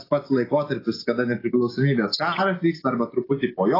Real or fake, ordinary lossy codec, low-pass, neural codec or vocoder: real; AAC, 24 kbps; 5.4 kHz; none